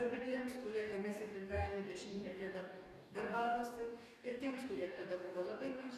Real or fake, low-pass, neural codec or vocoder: fake; 14.4 kHz; codec, 44.1 kHz, 2.6 kbps, DAC